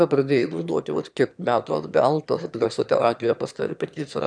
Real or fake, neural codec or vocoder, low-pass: fake; autoencoder, 22.05 kHz, a latent of 192 numbers a frame, VITS, trained on one speaker; 9.9 kHz